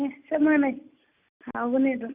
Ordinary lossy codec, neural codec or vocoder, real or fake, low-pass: Opus, 64 kbps; none; real; 3.6 kHz